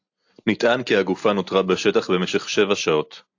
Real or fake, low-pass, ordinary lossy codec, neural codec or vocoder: real; 7.2 kHz; AAC, 48 kbps; none